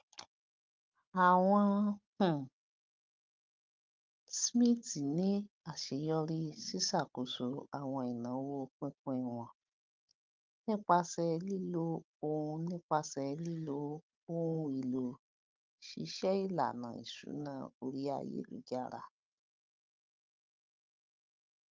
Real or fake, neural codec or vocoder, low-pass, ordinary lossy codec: fake; codec, 16 kHz, 16 kbps, FunCodec, trained on Chinese and English, 50 frames a second; 7.2 kHz; Opus, 32 kbps